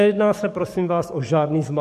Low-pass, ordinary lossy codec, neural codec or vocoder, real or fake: 14.4 kHz; MP3, 64 kbps; codec, 44.1 kHz, 7.8 kbps, DAC; fake